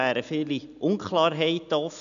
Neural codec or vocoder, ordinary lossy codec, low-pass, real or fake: none; none; 7.2 kHz; real